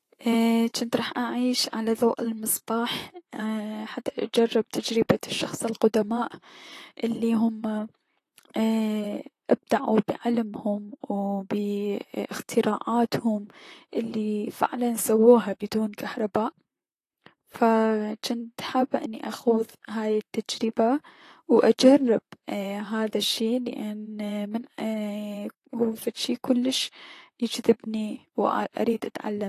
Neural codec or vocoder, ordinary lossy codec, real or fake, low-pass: vocoder, 44.1 kHz, 128 mel bands, Pupu-Vocoder; AAC, 48 kbps; fake; 14.4 kHz